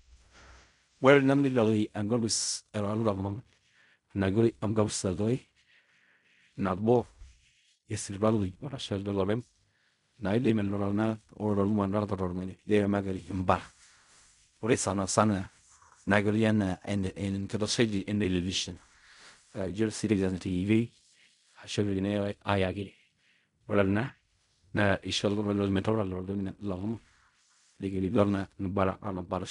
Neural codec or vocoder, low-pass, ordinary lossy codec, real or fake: codec, 16 kHz in and 24 kHz out, 0.4 kbps, LongCat-Audio-Codec, fine tuned four codebook decoder; 10.8 kHz; none; fake